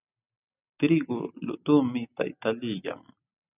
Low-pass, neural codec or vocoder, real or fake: 3.6 kHz; none; real